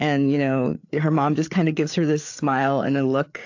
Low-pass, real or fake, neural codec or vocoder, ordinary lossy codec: 7.2 kHz; fake; codec, 16 kHz, 4 kbps, FreqCodec, larger model; AAC, 48 kbps